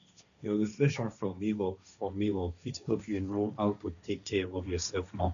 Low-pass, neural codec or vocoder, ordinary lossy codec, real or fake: 7.2 kHz; codec, 16 kHz, 1.1 kbps, Voila-Tokenizer; none; fake